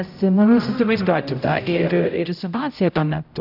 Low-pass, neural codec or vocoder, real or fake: 5.4 kHz; codec, 16 kHz, 0.5 kbps, X-Codec, HuBERT features, trained on general audio; fake